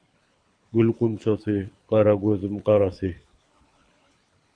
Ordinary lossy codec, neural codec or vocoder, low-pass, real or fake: MP3, 96 kbps; codec, 24 kHz, 6 kbps, HILCodec; 9.9 kHz; fake